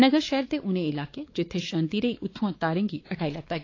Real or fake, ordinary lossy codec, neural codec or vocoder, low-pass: fake; AAC, 32 kbps; codec, 16 kHz, 4 kbps, X-Codec, WavLM features, trained on Multilingual LibriSpeech; 7.2 kHz